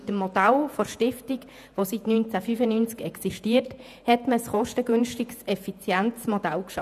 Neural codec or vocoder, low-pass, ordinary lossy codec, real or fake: vocoder, 48 kHz, 128 mel bands, Vocos; 14.4 kHz; none; fake